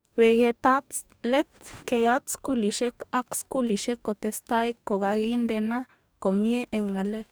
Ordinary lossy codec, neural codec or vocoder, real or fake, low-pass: none; codec, 44.1 kHz, 2.6 kbps, DAC; fake; none